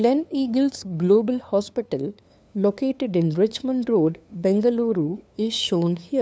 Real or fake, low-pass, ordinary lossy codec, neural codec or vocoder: fake; none; none; codec, 16 kHz, 2 kbps, FunCodec, trained on LibriTTS, 25 frames a second